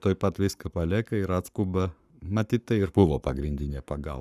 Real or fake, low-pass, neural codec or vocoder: fake; 14.4 kHz; codec, 44.1 kHz, 7.8 kbps, Pupu-Codec